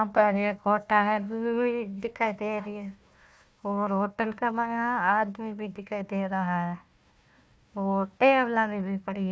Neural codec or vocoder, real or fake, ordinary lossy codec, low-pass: codec, 16 kHz, 1 kbps, FunCodec, trained on Chinese and English, 50 frames a second; fake; none; none